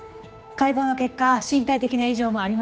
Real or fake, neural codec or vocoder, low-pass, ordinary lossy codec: fake; codec, 16 kHz, 2 kbps, X-Codec, HuBERT features, trained on general audio; none; none